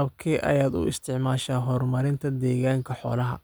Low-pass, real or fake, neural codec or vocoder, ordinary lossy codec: none; real; none; none